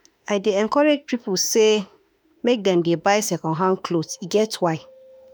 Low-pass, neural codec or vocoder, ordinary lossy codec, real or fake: none; autoencoder, 48 kHz, 32 numbers a frame, DAC-VAE, trained on Japanese speech; none; fake